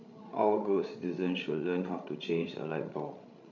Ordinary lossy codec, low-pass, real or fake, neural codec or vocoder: none; 7.2 kHz; fake; codec, 16 kHz, 16 kbps, FreqCodec, larger model